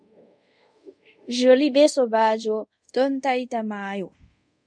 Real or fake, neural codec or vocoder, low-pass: fake; codec, 24 kHz, 0.5 kbps, DualCodec; 9.9 kHz